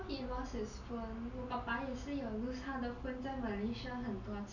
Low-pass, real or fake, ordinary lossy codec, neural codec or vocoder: 7.2 kHz; real; none; none